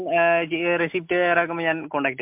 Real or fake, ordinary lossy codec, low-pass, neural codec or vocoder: real; MP3, 32 kbps; 3.6 kHz; none